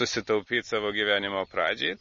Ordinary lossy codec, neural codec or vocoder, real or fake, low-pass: MP3, 32 kbps; none; real; 7.2 kHz